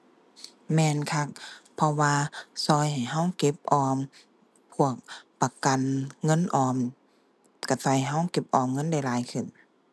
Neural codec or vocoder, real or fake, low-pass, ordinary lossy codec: none; real; none; none